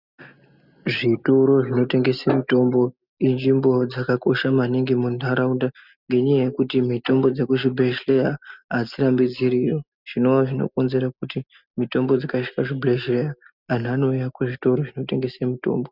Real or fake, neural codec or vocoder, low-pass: real; none; 5.4 kHz